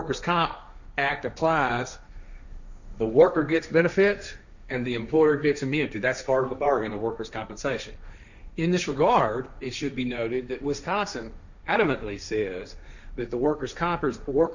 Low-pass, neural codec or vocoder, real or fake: 7.2 kHz; codec, 16 kHz, 1.1 kbps, Voila-Tokenizer; fake